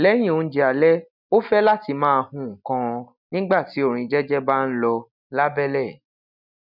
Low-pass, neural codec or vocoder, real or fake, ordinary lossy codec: 5.4 kHz; none; real; none